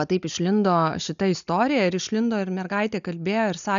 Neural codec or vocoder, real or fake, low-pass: none; real; 7.2 kHz